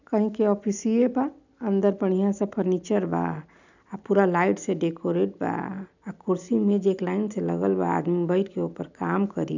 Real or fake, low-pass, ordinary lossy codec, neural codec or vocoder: real; 7.2 kHz; none; none